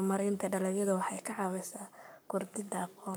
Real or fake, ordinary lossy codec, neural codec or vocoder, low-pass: fake; none; codec, 44.1 kHz, 7.8 kbps, Pupu-Codec; none